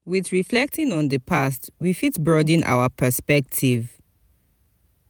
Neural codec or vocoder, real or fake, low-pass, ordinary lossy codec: vocoder, 48 kHz, 128 mel bands, Vocos; fake; none; none